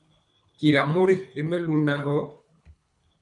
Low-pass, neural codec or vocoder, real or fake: 10.8 kHz; codec, 24 kHz, 3 kbps, HILCodec; fake